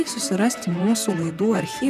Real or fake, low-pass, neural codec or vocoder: fake; 14.4 kHz; vocoder, 44.1 kHz, 128 mel bands, Pupu-Vocoder